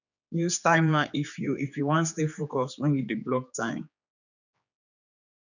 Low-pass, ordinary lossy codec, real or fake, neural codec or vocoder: 7.2 kHz; none; fake; codec, 16 kHz, 4 kbps, X-Codec, HuBERT features, trained on general audio